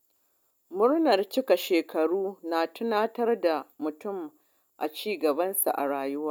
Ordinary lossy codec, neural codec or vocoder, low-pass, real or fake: none; none; none; real